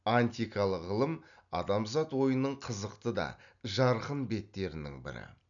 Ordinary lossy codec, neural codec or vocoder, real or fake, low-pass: none; none; real; 7.2 kHz